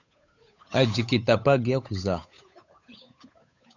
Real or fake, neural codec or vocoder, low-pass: fake; codec, 16 kHz, 8 kbps, FunCodec, trained on Chinese and English, 25 frames a second; 7.2 kHz